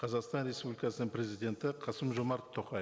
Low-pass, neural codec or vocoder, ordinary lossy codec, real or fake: none; none; none; real